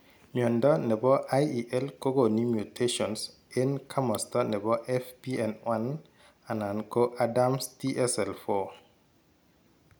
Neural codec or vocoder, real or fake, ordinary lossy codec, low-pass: none; real; none; none